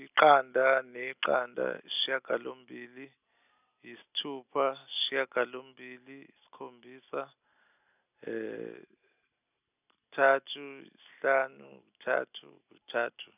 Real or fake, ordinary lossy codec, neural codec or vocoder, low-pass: real; none; none; 3.6 kHz